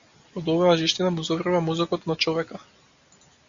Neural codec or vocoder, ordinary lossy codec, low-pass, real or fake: none; Opus, 64 kbps; 7.2 kHz; real